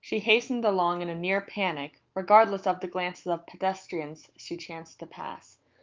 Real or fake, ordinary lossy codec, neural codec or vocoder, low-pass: fake; Opus, 24 kbps; autoencoder, 48 kHz, 128 numbers a frame, DAC-VAE, trained on Japanese speech; 7.2 kHz